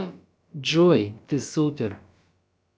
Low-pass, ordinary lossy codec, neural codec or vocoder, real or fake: none; none; codec, 16 kHz, about 1 kbps, DyCAST, with the encoder's durations; fake